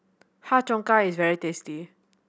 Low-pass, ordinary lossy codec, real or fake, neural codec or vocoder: none; none; real; none